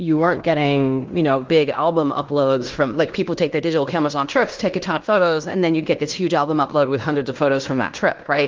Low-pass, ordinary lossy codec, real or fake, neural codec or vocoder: 7.2 kHz; Opus, 32 kbps; fake; codec, 16 kHz in and 24 kHz out, 0.9 kbps, LongCat-Audio-Codec, fine tuned four codebook decoder